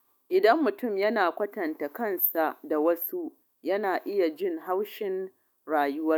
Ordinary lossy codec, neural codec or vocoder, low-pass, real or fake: none; autoencoder, 48 kHz, 128 numbers a frame, DAC-VAE, trained on Japanese speech; none; fake